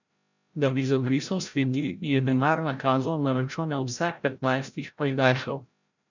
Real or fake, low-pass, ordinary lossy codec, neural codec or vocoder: fake; 7.2 kHz; none; codec, 16 kHz, 0.5 kbps, FreqCodec, larger model